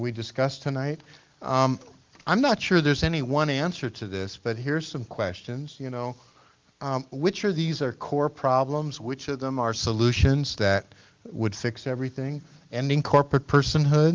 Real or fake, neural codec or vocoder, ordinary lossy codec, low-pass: real; none; Opus, 32 kbps; 7.2 kHz